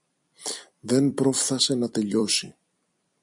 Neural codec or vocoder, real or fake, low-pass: vocoder, 44.1 kHz, 128 mel bands every 256 samples, BigVGAN v2; fake; 10.8 kHz